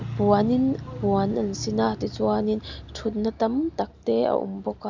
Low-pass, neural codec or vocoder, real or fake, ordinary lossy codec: 7.2 kHz; none; real; none